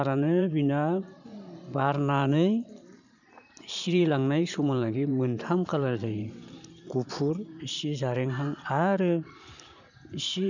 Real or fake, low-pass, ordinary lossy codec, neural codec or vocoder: fake; 7.2 kHz; none; vocoder, 44.1 kHz, 128 mel bands every 512 samples, BigVGAN v2